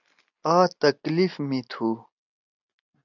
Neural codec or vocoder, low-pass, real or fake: none; 7.2 kHz; real